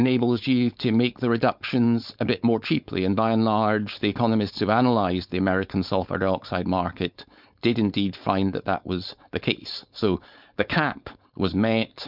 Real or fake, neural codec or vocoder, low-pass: fake; codec, 16 kHz, 4.8 kbps, FACodec; 5.4 kHz